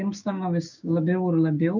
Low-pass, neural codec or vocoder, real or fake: 7.2 kHz; codec, 16 kHz, 6 kbps, DAC; fake